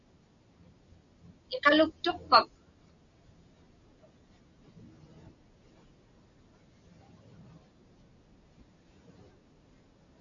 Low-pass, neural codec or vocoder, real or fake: 7.2 kHz; none; real